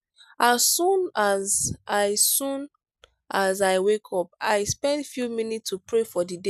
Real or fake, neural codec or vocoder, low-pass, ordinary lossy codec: real; none; 14.4 kHz; none